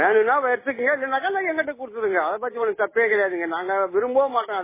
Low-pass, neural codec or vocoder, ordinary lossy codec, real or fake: 3.6 kHz; none; MP3, 16 kbps; real